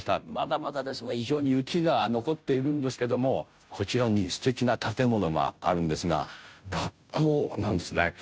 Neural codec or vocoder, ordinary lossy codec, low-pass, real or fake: codec, 16 kHz, 0.5 kbps, FunCodec, trained on Chinese and English, 25 frames a second; none; none; fake